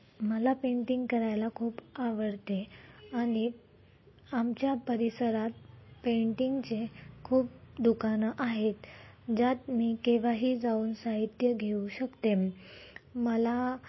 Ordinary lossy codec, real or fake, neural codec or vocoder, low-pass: MP3, 24 kbps; real; none; 7.2 kHz